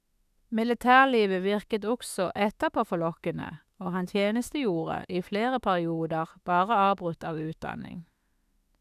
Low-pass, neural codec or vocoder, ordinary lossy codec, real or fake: 14.4 kHz; autoencoder, 48 kHz, 32 numbers a frame, DAC-VAE, trained on Japanese speech; none; fake